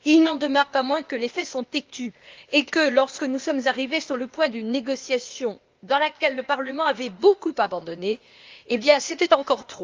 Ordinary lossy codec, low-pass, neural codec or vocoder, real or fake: Opus, 32 kbps; 7.2 kHz; codec, 16 kHz, 0.8 kbps, ZipCodec; fake